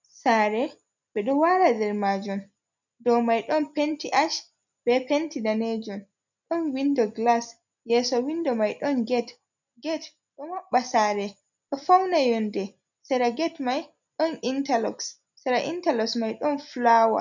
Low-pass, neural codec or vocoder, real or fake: 7.2 kHz; none; real